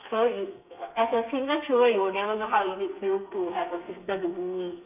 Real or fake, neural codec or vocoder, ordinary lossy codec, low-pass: fake; codec, 32 kHz, 1.9 kbps, SNAC; none; 3.6 kHz